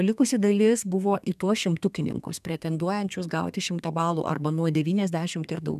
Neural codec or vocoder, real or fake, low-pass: codec, 32 kHz, 1.9 kbps, SNAC; fake; 14.4 kHz